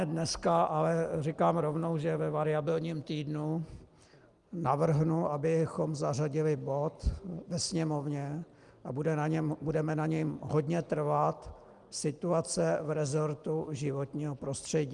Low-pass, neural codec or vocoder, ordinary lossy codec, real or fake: 10.8 kHz; none; Opus, 32 kbps; real